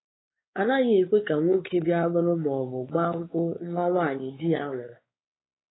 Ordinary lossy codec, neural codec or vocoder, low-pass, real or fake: AAC, 16 kbps; codec, 16 kHz, 4 kbps, X-Codec, WavLM features, trained on Multilingual LibriSpeech; 7.2 kHz; fake